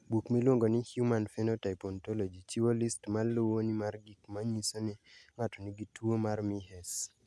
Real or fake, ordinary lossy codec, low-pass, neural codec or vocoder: real; none; none; none